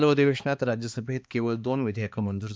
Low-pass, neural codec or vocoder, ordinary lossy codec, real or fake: none; codec, 16 kHz, 2 kbps, X-Codec, HuBERT features, trained on balanced general audio; none; fake